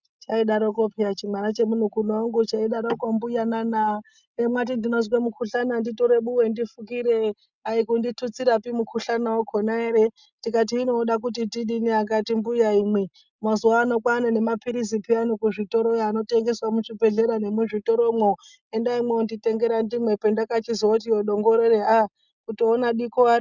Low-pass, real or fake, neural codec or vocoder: 7.2 kHz; real; none